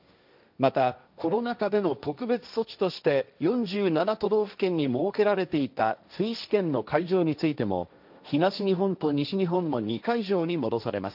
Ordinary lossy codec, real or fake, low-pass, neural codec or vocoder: none; fake; 5.4 kHz; codec, 16 kHz, 1.1 kbps, Voila-Tokenizer